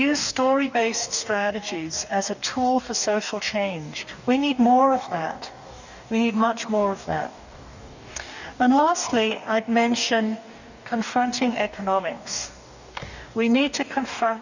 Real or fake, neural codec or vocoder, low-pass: fake; codec, 44.1 kHz, 2.6 kbps, DAC; 7.2 kHz